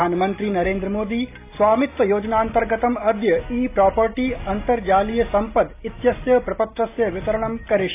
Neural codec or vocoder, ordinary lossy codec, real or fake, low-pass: none; AAC, 24 kbps; real; 3.6 kHz